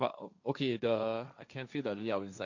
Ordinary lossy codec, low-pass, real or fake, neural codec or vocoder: none; 7.2 kHz; fake; codec, 16 kHz, 1.1 kbps, Voila-Tokenizer